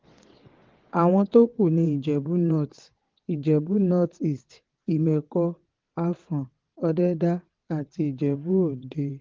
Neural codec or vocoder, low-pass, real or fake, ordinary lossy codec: vocoder, 22.05 kHz, 80 mel bands, WaveNeXt; 7.2 kHz; fake; Opus, 16 kbps